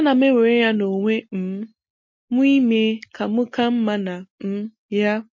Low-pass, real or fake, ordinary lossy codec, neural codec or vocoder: 7.2 kHz; real; MP3, 32 kbps; none